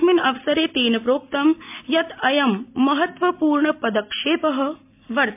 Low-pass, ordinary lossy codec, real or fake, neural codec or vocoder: 3.6 kHz; MP3, 32 kbps; real; none